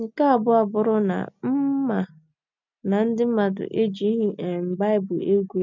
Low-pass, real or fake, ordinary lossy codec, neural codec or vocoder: 7.2 kHz; real; none; none